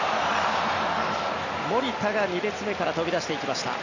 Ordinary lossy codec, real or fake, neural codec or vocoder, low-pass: none; real; none; 7.2 kHz